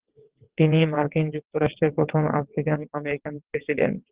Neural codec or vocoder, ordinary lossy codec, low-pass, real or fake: vocoder, 22.05 kHz, 80 mel bands, WaveNeXt; Opus, 16 kbps; 3.6 kHz; fake